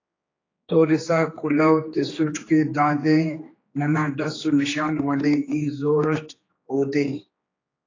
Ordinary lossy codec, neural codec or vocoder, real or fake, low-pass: AAC, 32 kbps; codec, 16 kHz, 2 kbps, X-Codec, HuBERT features, trained on general audio; fake; 7.2 kHz